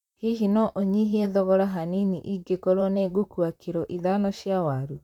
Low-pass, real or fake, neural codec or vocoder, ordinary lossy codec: 19.8 kHz; fake; vocoder, 44.1 kHz, 128 mel bands, Pupu-Vocoder; none